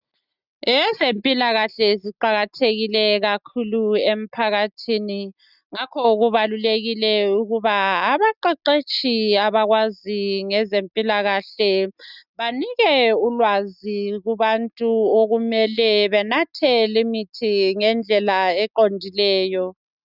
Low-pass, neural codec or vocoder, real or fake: 5.4 kHz; none; real